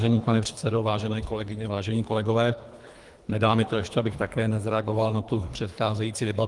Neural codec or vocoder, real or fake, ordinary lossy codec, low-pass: codec, 24 kHz, 3 kbps, HILCodec; fake; Opus, 32 kbps; 10.8 kHz